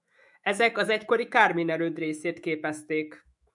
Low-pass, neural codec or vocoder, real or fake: 10.8 kHz; autoencoder, 48 kHz, 128 numbers a frame, DAC-VAE, trained on Japanese speech; fake